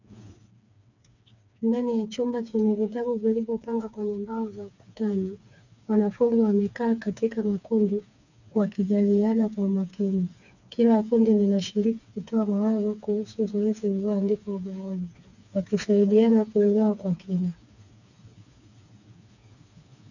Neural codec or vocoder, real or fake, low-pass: codec, 16 kHz, 4 kbps, FreqCodec, smaller model; fake; 7.2 kHz